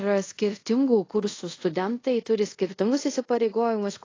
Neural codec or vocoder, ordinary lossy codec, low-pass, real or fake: codec, 24 kHz, 0.5 kbps, DualCodec; AAC, 32 kbps; 7.2 kHz; fake